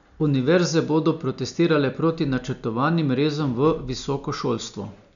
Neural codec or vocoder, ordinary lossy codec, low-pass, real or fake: none; MP3, 96 kbps; 7.2 kHz; real